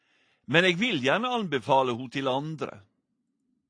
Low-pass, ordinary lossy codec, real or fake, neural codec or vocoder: 9.9 kHz; AAC, 48 kbps; real; none